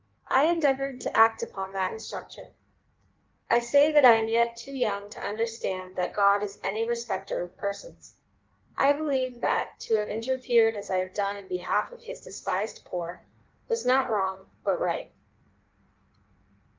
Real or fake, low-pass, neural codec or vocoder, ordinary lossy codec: fake; 7.2 kHz; codec, 16 kHz in and 24 kHz out, 1.1 kbps, FireRedTTS-2 codec; Opus, 32 kbps